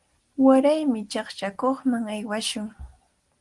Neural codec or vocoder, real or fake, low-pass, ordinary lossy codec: none; real; 10.8 kHz; Opus, 24 kbps